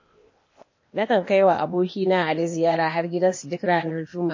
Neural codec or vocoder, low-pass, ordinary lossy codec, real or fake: codec, 16 kHz, 0.8 kbps, ZipCodec; 7.2 kHz; MP3, 32 kbps; fake